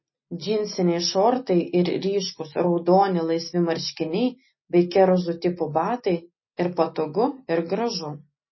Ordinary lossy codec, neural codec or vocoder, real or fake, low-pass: MP3, 24 kbps; none; real; 7.2 kHz